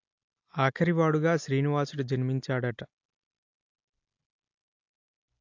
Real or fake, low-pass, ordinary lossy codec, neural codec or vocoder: real; 7.2 kHz; AAC, 48 kbps; none